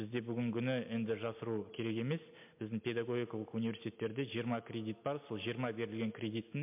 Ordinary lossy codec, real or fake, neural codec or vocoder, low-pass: MP3, 32 kbps; real; none; 3.6 kHz